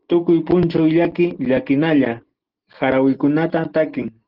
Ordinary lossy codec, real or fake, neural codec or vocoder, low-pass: Opus, 16 kbps; real; none; 5.4 kHz